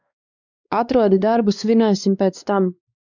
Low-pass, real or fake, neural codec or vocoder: 7.2 kHz; fake; codec, 16 kHz, 2 kbps, X-Codec, WavLM features, trained on Multilingual LibriSpeech